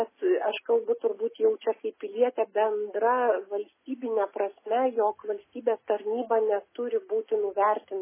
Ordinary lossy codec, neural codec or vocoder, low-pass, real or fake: MP3, 16 kbps; none; 3.6 kHz; real